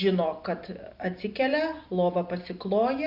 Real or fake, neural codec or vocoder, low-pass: real; none; 5.4 kHz